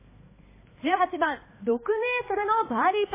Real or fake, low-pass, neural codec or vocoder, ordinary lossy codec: fake; 3.6 kHz; codec, 16 kHz, 2 kbps, X-Codec, HuBERT features, trained on balanced general audio; MP3, 16 kbps